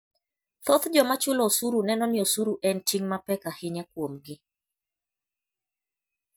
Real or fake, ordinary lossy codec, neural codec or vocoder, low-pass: real; none; none; none